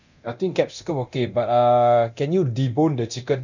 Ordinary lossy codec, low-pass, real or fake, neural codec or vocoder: none; 7.2 kHz; fake; codec, 24 kHz, 0.9 kbps, DualCodec